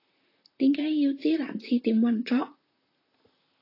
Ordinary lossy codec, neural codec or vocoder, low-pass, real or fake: AAC, 32 kbps; vocoder, 44.1 kHz, 128 mel bands, Pupu-Vocoder; 5.4 kHz; fake